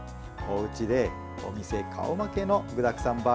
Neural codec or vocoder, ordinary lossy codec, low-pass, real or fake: none; none; none; real